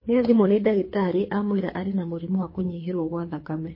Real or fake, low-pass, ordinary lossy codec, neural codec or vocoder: fake; 5.4 kHz; MP3, 24 kbps; codec, 24 kHz, 3 kbps, HILCodec